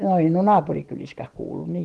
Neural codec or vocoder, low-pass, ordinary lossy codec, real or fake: none; 10.8 kHz; Opus, 16 kbps; real